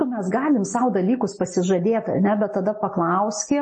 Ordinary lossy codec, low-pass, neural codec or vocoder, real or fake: MP3, 32 kbps; 10.8 kHz; none; real